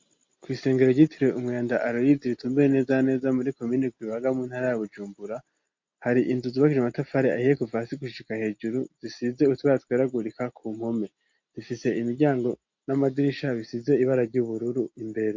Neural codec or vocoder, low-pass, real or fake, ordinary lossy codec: none; 7.2 kHz; real; MP3, 48 kbps